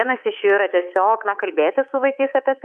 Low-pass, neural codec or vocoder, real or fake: 10.8 kHz; codec, 24 kHz, 3.1 kbps, DualCodec; fake